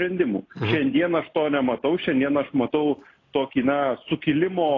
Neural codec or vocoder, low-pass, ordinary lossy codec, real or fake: none; 7.2 kHz; AAC, 32 kbps; real